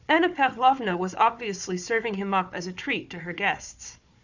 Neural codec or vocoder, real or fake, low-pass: codec, 16 kHz, 4 kbps, FunCodec, trained on Chinese and English, 50 frames a second; fake; 7.2 kHz